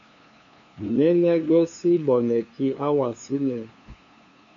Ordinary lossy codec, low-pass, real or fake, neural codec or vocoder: MP3, 64 kbps; 7.2 kHz; fake; codec, 16 kHz, 2 kbps, FunCodec, trained on LibriTTS, 25 frames a second